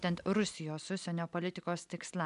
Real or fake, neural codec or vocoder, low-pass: real; none; 10.8 kHz